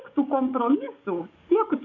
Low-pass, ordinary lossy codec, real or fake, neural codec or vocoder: 7.2 kHz; AAC, 48 kbps; fake; codec, 44.1 kHz, 7.8 kbps, DAC